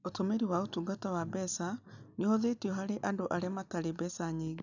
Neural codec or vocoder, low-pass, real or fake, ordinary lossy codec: none; 7.2 kHz; real; none